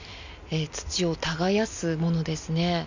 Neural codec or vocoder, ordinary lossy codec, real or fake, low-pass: none; none; real; 7.2 kHz